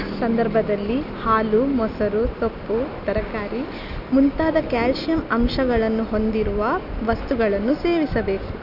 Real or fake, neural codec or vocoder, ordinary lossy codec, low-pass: real; none; none; 5.4 kHz